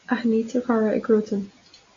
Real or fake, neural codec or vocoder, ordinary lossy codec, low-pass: real; none; AAC, 48 kbps; 7.2 kHz